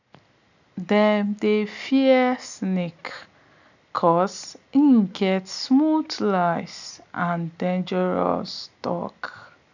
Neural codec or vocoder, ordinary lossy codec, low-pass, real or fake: none; none; 7.2 kHz; real